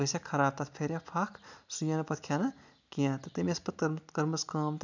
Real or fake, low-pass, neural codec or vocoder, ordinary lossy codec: real; 7.2 kHz; none; none